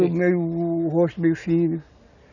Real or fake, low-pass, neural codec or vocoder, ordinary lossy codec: real; 7.2 kHz; none; none